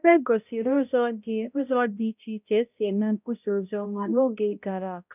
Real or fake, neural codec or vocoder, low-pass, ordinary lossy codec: fake; codec, 16 kHz, 0.5 kbps, X-Codec, HuBERT features, trained on balanced general audio; 3.6 kHz; none